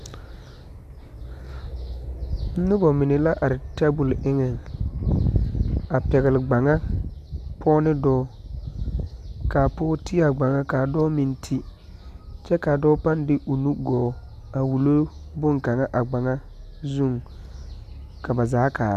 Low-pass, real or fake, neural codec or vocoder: 14.4 kHz; real; none